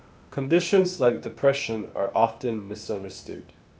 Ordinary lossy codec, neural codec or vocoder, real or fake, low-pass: none; codec, 16 kHz, 0.8 kbps, ZipCodec; fake; none